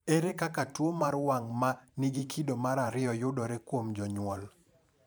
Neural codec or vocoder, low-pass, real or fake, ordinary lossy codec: vocoder, 44.1 kHz, 128 mel bands every 512 samples, BigVGAN v2; none; fake; none